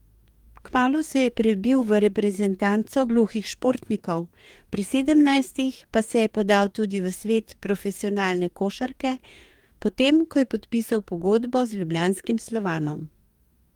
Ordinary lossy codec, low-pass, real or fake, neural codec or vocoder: Opus, 32 kbps; 19.8 kHz; fake; codec, 44.1 kHz, 2.6 kbps, DAC